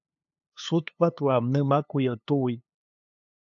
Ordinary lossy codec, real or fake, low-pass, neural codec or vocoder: AAC, 64 kbps; fake; 7.2 kHz; codec, 16 kHz, 2 kbps, FunCodec, trained on LibriTTS, 25 frames a second